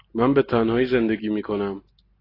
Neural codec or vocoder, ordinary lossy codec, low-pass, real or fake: none; AAC, 24 kbps; 5.4 kHz; real